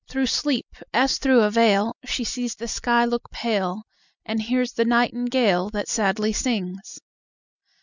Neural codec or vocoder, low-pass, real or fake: none; 7.2 kHz; real